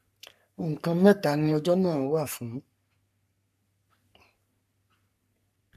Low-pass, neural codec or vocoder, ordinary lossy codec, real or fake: 14.4 kHz; codec, 44.1 kHz, 3.4 kbps, Pupu-Codec; none; fake